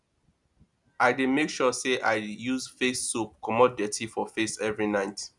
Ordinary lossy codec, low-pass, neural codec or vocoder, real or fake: none; 10.8 kHz; none; real